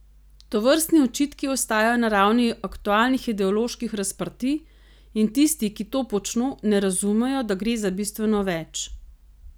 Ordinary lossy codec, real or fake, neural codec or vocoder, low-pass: none; real; none; none